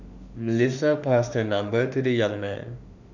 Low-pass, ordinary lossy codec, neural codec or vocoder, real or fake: 7.2 kHz; none; autoencoder, 48 kHz, 32 numbers a frame, DAC-VAE, trained on Japanese speech; fake